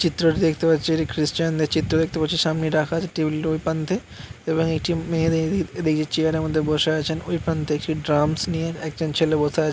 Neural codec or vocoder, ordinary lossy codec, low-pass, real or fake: none; none; none; real